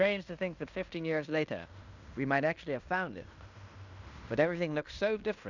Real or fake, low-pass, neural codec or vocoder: fake; 7.2 kHz; codec, 16 kHz in and 24 kHz out, 0.9 kbps, LongCat-Audio-Codec, fine tuned four codebook decoder